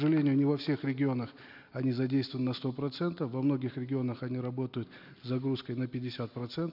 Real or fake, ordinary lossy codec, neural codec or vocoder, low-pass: real; none; none; 5.4 kHz